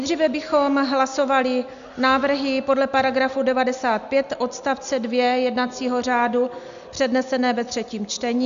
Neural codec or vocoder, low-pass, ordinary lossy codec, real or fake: none; 7.2 kHz; AAC, 96 kbps; real